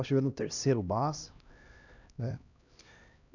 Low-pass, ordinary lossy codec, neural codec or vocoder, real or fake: 7.2 kHz; none; codec, 16 kHz, 1 kbps, X-Codec, HuBERT features, trained on LibriSpeech; fake